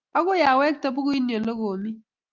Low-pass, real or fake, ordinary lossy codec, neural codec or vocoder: 7.2 kHz; real; Opus, 32 kbps; none